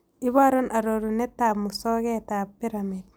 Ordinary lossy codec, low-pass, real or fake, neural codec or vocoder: none; none; real; none